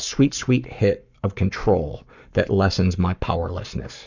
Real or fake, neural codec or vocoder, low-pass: fake; codec, 44.1 kHz, 7.8 kbps, Pupu-Codec; 7.2 kHz